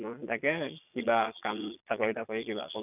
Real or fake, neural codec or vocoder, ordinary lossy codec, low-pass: fake; vocoder, 22.05 kHz, 80 mel bands, Vocos; none; 3.6 kHz